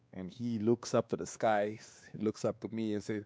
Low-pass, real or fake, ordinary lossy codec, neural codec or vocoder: none; fake; none; codec, 16 kHz, 2 kbps, X-Codec, WavLM features, trained on Multilingual LibriSpeech